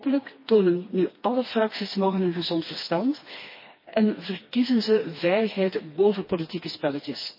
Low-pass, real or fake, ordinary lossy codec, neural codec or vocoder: 5.4 kHz; fake; MP3, 24 kbps; codec, 16 kHz, 2 kbps, FreqCodec, smaller model